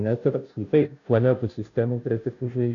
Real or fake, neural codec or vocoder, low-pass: fake; codec, 16 kHz, 0.5 kbps, FunCodec, trained on Chinese and English, 25 frames a second; 7.2 kHz